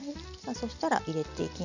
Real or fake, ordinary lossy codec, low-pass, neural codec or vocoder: real; none; 7.2 kHz; none